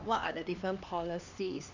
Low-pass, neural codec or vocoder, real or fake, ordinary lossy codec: 7.2 kHz; codec, 16 kHz, 2 kbps, X-Codec, HuBERT features, trained on LibriSpeech; fake; none